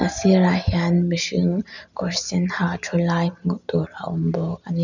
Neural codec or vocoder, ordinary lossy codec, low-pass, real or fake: none; none; 7.2 kHz; real